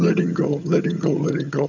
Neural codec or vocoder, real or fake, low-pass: vocoder, 22.05 kHz, 80 mel bands, HiFi-GAN; fake; 7.2 kHz